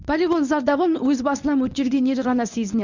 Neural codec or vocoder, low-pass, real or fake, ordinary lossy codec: codec, 24 kHz, 0.9 kbps, WavTokenizer, medium speech release version 1; 7.2 kHz; fake; none